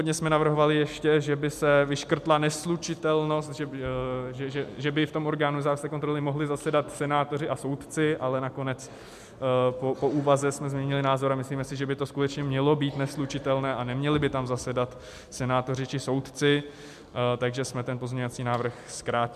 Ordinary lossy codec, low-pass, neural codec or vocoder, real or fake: MP3, 96 kbps; 14.4 kHz; none; real